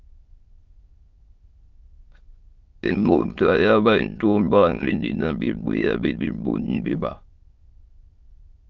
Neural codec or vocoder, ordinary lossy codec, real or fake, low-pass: autoencoder, 22.05 kHz, a latent of 192 numbers a frame, VITS, trained on many speakers; Opus, 32 kbps; fake; 7.2 kHz